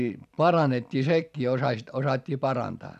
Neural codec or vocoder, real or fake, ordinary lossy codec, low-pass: vocoder, 44.1 kHz, 128 mel bands every 256 samples, BigVGAN v2; fake; none; 14.4 kHz